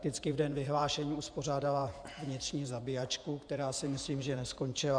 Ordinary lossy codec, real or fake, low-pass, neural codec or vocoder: AAC, 64 kbps; fake; 9.9 kHz; vocoder, 48 kHz, 128 mel bands, Vocos